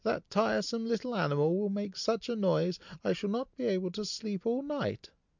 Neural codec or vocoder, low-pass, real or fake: none; 7.2 kHz; real